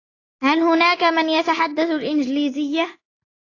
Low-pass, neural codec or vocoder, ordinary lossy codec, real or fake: 7.2 kHz; none; AAC, 32 kbps; real